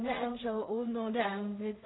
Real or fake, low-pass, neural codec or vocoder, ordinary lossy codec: fake; 7.2 kHz; codec, 16 kHz in and 24 kHz out, 0.4 kbps, LongCat-Audio-Codec, two codebook decoder; AAC, 16 kbps